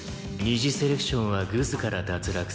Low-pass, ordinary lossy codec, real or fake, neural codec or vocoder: none; none; real; none